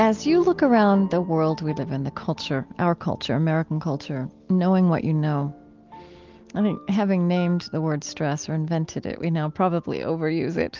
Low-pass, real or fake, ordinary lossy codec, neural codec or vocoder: 7.2 kHz; real; Opus, 32 kbps; none